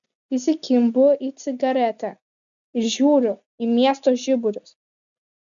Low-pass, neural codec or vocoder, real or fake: 7.2 kHz; none; real